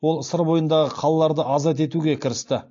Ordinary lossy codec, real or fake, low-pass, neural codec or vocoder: AAC, 48 kbps; real; 7.2 kHz; none